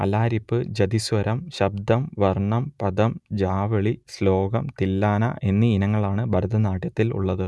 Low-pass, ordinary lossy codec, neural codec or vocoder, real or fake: none; none; none; real